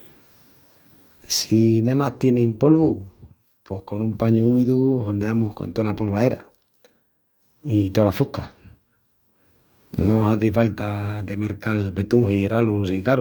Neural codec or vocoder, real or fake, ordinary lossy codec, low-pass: codec, 44.1 kHz, 2.6 kbps, DAC; fake; none; none